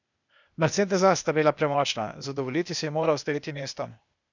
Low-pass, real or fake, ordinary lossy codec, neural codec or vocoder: 7.2 kHz; fake; none; codec, 16 kHz, 0.8 kbps, ZipCodec